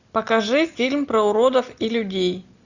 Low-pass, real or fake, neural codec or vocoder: 7.2 kHz; real; none